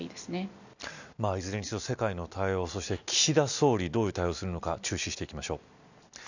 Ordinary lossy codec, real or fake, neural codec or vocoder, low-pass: none; real; none; 7.2 kHz